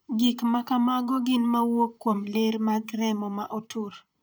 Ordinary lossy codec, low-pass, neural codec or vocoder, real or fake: none; none; vocoder, 44.1 kHz, 128 mel bands, Pupu-Vocoder; fake